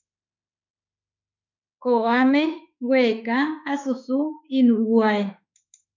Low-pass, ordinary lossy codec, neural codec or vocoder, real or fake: 7.2 kHz; MP3, 64 kbps; autoencoder, 48 kHz, 32 numbers a frame, DAC-VAE, trained on Japanese speech; fake